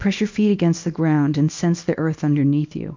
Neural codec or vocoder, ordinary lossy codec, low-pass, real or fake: codec, 24 kHz, 0.9 kbps, WavTokenizer, small release; MP3, 48 kbps; 7.2 kHz; fake